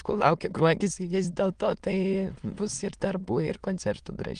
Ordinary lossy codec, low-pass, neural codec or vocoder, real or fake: Opus, 24 kbps; 9.9 kHz; autoencoder, 22.05 kHz, a latent of 192 numbers a frame, VITS, trained on many speakers; fake